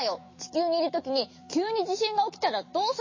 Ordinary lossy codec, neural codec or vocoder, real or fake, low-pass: MP3, 32 kbps; autoencoder, 48 kHz, 128 numbers a frame, DAC-VAE, trained on Japanese speech; fake; 7.2 kHz